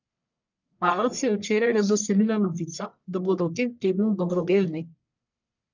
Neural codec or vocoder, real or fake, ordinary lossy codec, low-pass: codec, 44.1 kHz, 1.7 kbps, Pupu-Codec; fake; none; 7.2 kHz